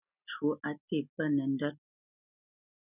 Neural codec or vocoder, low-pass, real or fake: none; 3.6 kHz; real